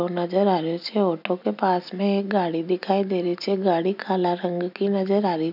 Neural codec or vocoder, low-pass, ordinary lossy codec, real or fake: none; 5.4 kHz; none; real